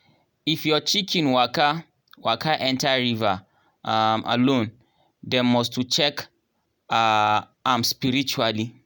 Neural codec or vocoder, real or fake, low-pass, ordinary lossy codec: none; real; none; none